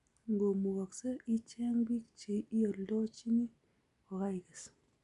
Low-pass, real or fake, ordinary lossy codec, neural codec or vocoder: 10.8 kHz; real; AAC, 96 kbps; none